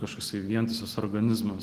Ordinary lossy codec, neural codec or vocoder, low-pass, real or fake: Opus, 16 kbps; none; 14.4 kHz; real